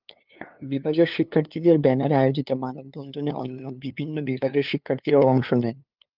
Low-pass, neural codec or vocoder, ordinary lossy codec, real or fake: 5.4 kHz; codec, 16 kHz, 2 kbps, FunCodec, trained on LibriTTS, 25 frames a second; Opus, 24 kbps; fake